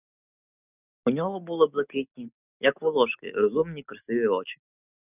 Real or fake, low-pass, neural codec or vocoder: real; 3.6 kHz; none